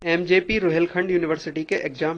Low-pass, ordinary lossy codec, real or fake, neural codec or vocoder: 9.9 kHz; AAC, 32 kbps; real; none